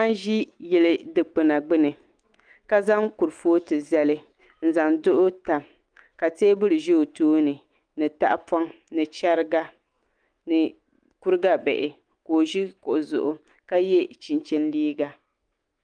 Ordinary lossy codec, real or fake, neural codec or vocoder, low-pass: Opus, 24 kbps; fake; autoencoder, 48 kHz, 128 numbers a frame, DAC-VAE, trained on Japanese speech; 9.9 kHz